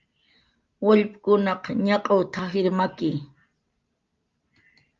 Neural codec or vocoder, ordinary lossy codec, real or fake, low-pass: none; Opus, 32 kbps; real; 7.2 kHz